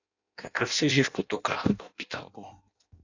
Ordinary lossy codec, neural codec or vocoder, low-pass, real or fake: AAC, 48 kbps; codec, 16 kHz in and 24 kHz out, 0.6 kbps, FireRedTTS-2 codec; 7.2 kHz; fake